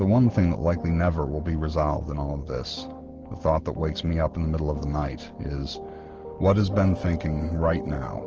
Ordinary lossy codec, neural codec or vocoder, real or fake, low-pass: Opus, 16 kbps; none; real; 7.2 kHz